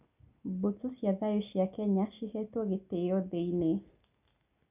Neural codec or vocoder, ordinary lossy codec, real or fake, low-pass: none; none; real; 3.6 kHz